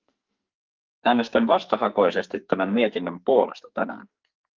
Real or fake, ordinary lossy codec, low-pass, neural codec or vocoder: fake; Opus, 24 kbps; 7.2 kHz; codec, 44.1 kHz, 2.6 kbps, SNAC